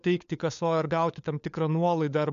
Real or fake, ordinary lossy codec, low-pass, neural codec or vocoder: fake; AAC, 96 kbps; 7.2 kHz; codec, 16 kHz, 4 kbps, FunCodec, trained on LibriTTS, 50 frames a second